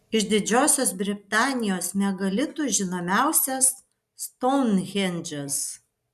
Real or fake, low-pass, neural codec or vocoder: real; 14.4 kHz; none